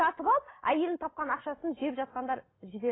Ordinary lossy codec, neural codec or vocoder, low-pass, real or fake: AAC, 16 kbps; none; 7.2 kHz; real